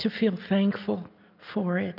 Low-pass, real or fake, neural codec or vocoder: 5.4 kHz; real; none